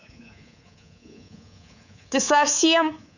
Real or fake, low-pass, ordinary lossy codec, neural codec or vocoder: fake; 7.2 kHz; none; codec, 24 kHz, 3.1 kbps, DualCodec